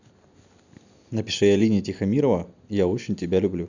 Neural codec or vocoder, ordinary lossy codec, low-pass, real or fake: none; none; 7.2 kHz; real